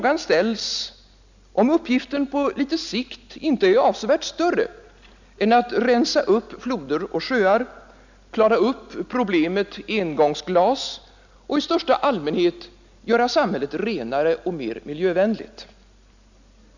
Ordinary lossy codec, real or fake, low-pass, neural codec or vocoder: none; real; 7.2 kHz; none